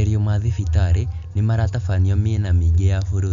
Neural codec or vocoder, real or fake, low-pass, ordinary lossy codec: none; real; 7.2 kHz; none